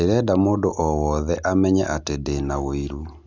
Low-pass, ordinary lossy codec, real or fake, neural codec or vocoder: none; none; real; none